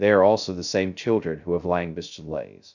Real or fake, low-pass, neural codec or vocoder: fake; 7.2 kHz; codec, 16 kHz, 0.2 kbps, FocalCodec